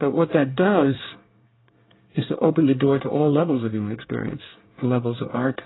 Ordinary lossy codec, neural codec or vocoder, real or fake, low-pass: AAC, 16 kbps; codec, 24 kHz, 1 kbps, SNAC; fake; 7.2 kHz